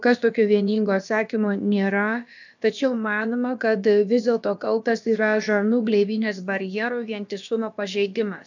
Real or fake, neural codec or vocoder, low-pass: fake; codec, 16 kHz, about 1 kbps, DyCAST, with the encoder's durations; 7.2 kHz